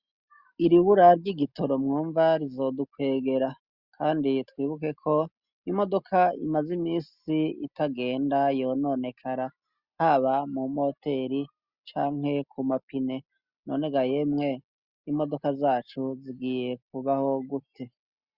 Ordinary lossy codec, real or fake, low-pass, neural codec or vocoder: Opus, 64 kbps; real; 5.4 kHz; none